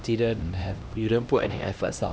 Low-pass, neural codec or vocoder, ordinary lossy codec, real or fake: none; codec, 16 kHz, 1 kbps, X-Codec, HuBERT features, trained on LibriSpeech; none; fake